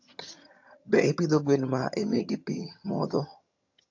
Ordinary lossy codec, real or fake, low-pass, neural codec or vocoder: AAC, 48 kbps; fake; 7.2 kHz; vocoder, 22.05 kHz, 80 mel bands, HiFi-GAN